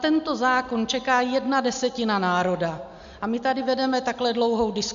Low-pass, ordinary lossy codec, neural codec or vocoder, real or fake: 7.2 kHz; MP3, 64 kbps; none; real